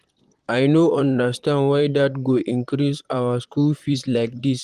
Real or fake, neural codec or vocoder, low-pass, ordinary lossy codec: fake; vocoder, 44.1 kHz, 128 mel bands, Pupu-Vocoder; 14.4 kHz; Opus, 24 kbps